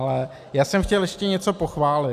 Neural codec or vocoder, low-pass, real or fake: none; 14.4 kHz; real